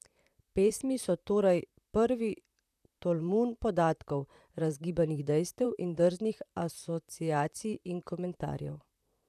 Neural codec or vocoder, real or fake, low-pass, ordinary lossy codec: vocoder, 44.1 kHz, 128 mel bands, Pupu-Vocoder; fake; 14.4 kHz; none